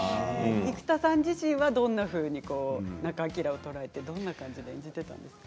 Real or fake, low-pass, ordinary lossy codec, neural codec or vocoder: real; none; none; none